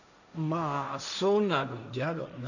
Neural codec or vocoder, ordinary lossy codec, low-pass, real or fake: codec, 16 kHz, 1.1 kbps, Voila-Tokenizer; none; 7.2 kHz; fake